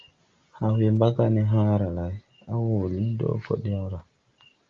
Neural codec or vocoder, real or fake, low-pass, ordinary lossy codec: none; real; 7.2 kHz; Opus, 32 kbps